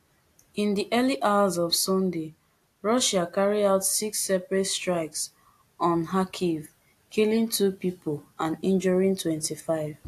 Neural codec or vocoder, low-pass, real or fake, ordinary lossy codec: none; 14.4 kHz; real; AAC, 64 kbps